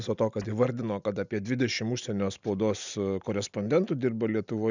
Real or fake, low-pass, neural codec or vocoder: real; 7.2 kHz; none